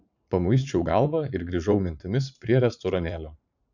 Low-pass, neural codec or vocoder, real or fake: 7.2 kHz; vocoder, 44.1 kHz, 80 mel bands, Vocos; fake